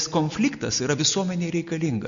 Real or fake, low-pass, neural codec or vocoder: real; 7.2 kHz; none